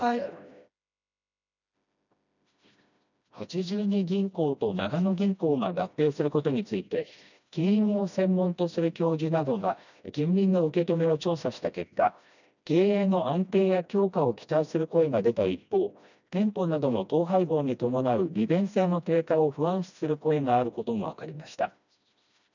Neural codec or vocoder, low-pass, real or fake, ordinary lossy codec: codec, 16 kHz, 1 kbps, FreqCodec, smaller model; 7.2 kHz; fake; none